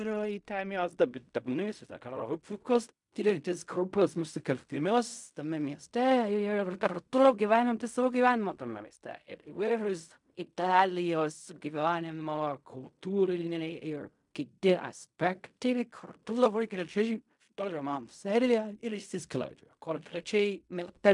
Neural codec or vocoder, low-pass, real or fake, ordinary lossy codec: codec, 16 kHz in and 24 kHz out, 0.4 kbps, LongCat-Audio-Codec, fine tuned four codebook decoder; 10.8 kHz; fake; MP3, 96 kbps